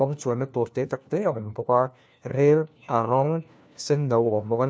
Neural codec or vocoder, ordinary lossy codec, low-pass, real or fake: codec, 16 kHz, 1 kbps, FunCodec, trained on LibriTTS, 50 frames a second; none; none; fake